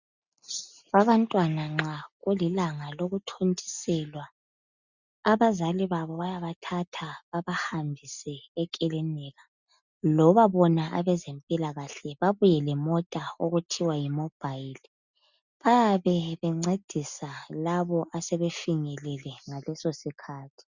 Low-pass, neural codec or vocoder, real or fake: 7.2 kHz; none; real